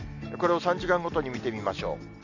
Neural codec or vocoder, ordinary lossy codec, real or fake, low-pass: none; none; real; 7.2 kHz